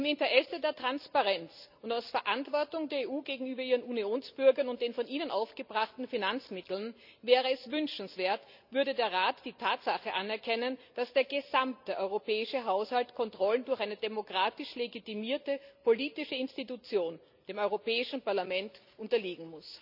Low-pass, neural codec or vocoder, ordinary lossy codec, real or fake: 5.4 kHz; none; none; real